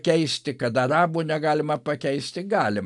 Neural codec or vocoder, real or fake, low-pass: none; real; 10.8 kHz